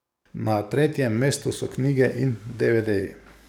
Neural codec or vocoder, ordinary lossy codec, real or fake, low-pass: codec, 44.1 kHz, 7.8 kbps, DAC; none; fake; 19.8 kHz